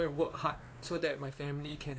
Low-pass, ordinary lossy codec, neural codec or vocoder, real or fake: none; none; codec, 16 kHz, 2 kbps, X-Codec, WavLM features, trained on Multilingual LibriSpeech; fake